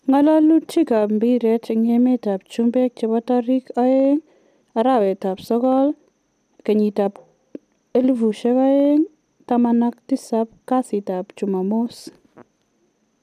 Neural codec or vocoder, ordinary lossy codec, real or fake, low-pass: none; none; real; 14.4 kHz